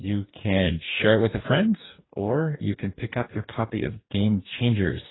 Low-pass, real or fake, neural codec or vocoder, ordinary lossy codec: 7.2 kHz; fake; codec, 44.1 kHz, 2.6 kbps, DAC; AAC, 16 kbps